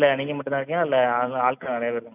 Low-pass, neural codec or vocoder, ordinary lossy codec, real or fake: 3.6 kHz; none; none; real